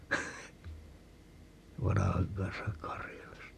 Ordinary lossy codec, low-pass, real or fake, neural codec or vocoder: none; 14.4 kHz; fake; vocoder, 44.1 kHz, 128 mel bands every 256 samples, BigVGAN v2